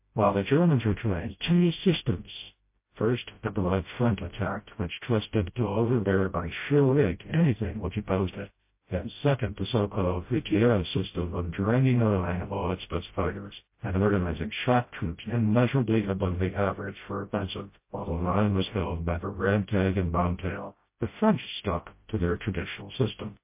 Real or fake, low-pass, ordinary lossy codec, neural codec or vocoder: fake; 3.6 kHz; MP3, 24 kbps; codec, 16 kHz, 0.5 kbps, FreqCodec, smaller model